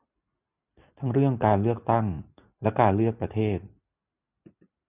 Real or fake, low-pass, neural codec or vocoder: real; 3.6 kHz; none